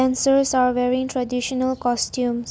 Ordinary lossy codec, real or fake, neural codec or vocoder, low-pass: none; real; none; none